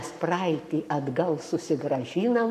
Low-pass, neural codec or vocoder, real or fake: 14.4 kHz; codec, 44.1 kHz, 7.8 kbps, Pupu-Codec; fake